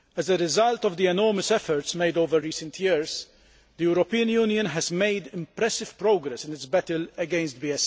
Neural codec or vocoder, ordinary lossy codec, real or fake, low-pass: none; none; real; none